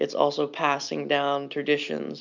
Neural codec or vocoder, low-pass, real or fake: none; 7.2 kHz; real